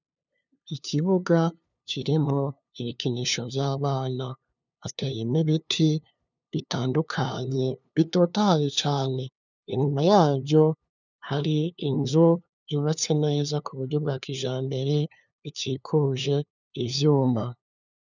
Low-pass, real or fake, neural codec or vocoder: 7.2 kHz; fake; codec, 16 kHz, 2 kbps, FunCodec, trained on LibriTTS, 25 frames a second